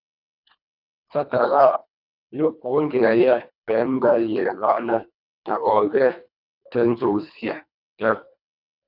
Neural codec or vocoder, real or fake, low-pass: codec, 24 kHz, 1.5 kbps, HILCodec; fake; 5.4 kHz